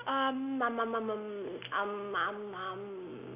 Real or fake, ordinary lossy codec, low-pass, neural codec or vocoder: real; none; 3.6 kHz; none